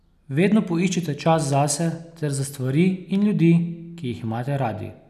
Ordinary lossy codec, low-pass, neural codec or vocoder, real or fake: none; 14.4 kHz; none; real